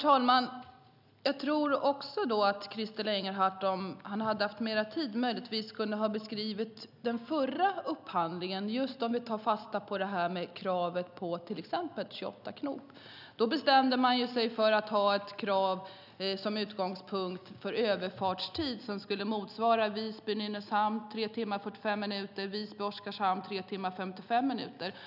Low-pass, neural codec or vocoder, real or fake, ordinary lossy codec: 5.4 kHz; none; real; none